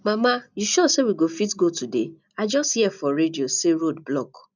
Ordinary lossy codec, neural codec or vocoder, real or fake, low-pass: none; none; real; 7.2 kHz